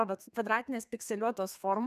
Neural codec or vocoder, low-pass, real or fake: codec, 32 kHz, 1.9 kbps, SNAC; 14.4 kHz; fake